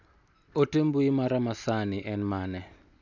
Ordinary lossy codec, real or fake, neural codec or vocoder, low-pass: none; real; none; 7.2 kHz